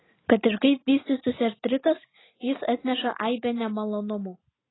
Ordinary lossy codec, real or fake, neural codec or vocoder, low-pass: AAC, 16 kbps; real; none; 7.2 kHz